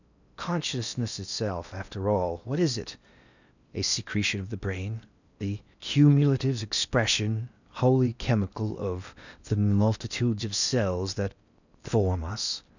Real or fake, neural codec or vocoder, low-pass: fake; codec, 16 kHz in and 24 kHz out, 0.8 kbps, FocalCodec, streaming, 65536 codes; 7.2 kHz